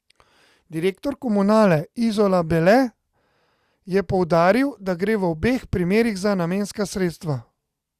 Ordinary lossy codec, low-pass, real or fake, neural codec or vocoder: Opus, 64 kbps; 14.4 kHz; real; none